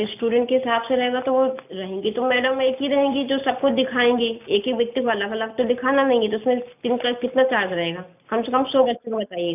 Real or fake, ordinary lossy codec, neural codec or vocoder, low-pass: real; none; none; 3.6 kHz